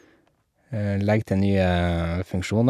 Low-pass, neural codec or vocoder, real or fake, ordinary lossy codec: 14.4 kHz; none; real; none